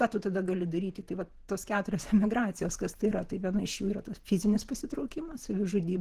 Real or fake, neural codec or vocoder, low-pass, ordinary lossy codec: fake; vocoder, 48 kHz, 128 mel bands, Vocos; 14.4 kHz; Opus, 16 kbps